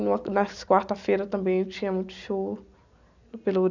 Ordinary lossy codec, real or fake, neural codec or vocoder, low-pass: none; real; none; 7.2 kHz